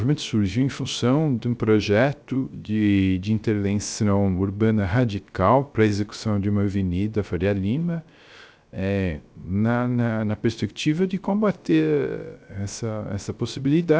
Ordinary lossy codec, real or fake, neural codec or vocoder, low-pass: none; fake; codec, 16 kHz, 0.3 kbps, FocalCodec; none